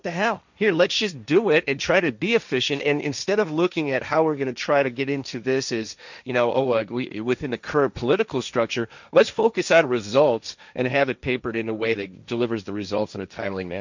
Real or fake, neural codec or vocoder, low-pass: fake; codec, 16 kHz, 1.1 kbps, Voila-Tokenizer; 7.2 kHz